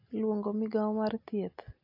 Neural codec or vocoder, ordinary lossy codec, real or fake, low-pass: none; AAC, 32 kbps; real; 5.4 kHz